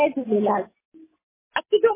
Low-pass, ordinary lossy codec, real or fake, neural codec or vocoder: 3.6 kHz; MP3, 16 kbps; real; none